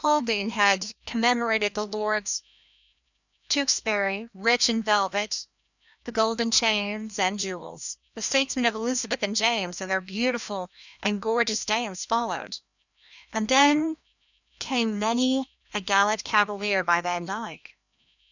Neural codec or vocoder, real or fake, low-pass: codec, 16 kHz, 1 kbps, FreqCodec, larger model; fake; 7.2 kHz